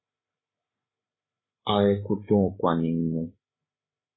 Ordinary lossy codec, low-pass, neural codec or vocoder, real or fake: AAC, 16 kbps; 7.2 kHz; codec, 16 kHz, 8 kbps, FreqCodec, larger model; fake